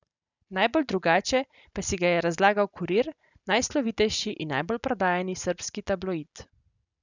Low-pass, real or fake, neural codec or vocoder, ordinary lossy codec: 7.2 kHz; real; none; none